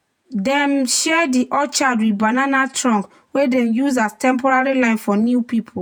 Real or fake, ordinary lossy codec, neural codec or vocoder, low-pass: fake; none; vocoder, 48 kHz, 128 mel bands, Vocos; none